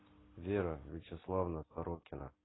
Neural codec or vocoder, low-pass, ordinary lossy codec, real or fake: none; 7.2 kHz; AAC, 16 kbps; real